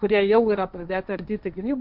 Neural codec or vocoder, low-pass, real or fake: codec, 16 kHz, 1.1 kbps, Voila-Tokenizer; 5.4 kHz; fake